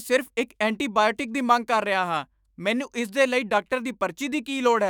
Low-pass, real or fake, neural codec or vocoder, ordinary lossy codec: none; fake; autoencoder, 48 kHz, 128 numbers a frame, DAC-VAE, trained on Japanese speech; none